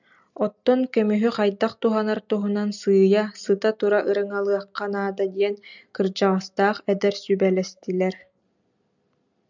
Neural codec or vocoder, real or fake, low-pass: none; real; 7.2 kHz